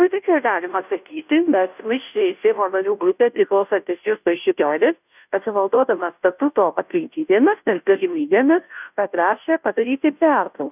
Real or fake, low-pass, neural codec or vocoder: fake; 3.6 kHz; codec, 16 kHz, 0.5 kbps, FunCodec, trained on Chinese and English, 25 frames a second